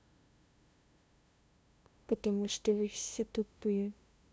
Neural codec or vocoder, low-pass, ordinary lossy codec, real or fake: codec, 16 kHz, 0.5 kbps, FunCodec, trained on LibriTTS, 25 frames a second; none; none; fake